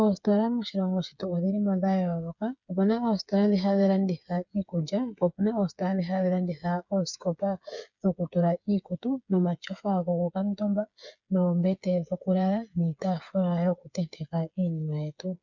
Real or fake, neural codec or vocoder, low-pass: fake; codec, 16 kHz, 8 kbps, FreqCodec, smaller model; 7.2 kHz